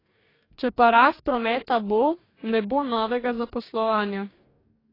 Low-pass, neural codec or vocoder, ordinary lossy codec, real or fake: 5.4 kHz; codec, 44.1 kHz, 2.6 kbps, DAC; AAC, 24 kbps; fake